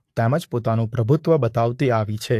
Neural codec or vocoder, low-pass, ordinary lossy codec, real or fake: codec, 44.1 kHz, 7.8 kbps, Pupu-Codec; 14.4 kHz; AAC, 64 kbps; fake